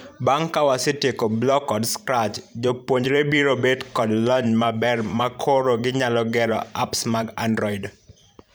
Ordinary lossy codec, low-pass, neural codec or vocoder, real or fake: none; none; vocoder, 44.1 kHz, 128 mel bands every 512 samples, BigVGAN v2; fake